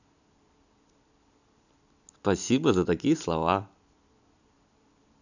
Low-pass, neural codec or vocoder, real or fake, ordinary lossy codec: 7.2 kHz; none; real; none